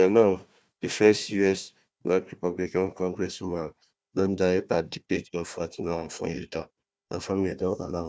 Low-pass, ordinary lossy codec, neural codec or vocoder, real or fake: none; none; codec, 16 kHz, 1 kbps, FunCodec, trained on Chinese and English, 50 frames a second; fake